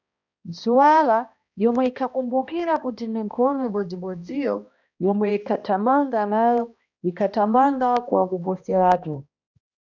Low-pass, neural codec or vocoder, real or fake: 7.2 kHz; codec, 16 kHz, 1 kbps, X-Codec, HuBERT features, trained on balanced general audio; fake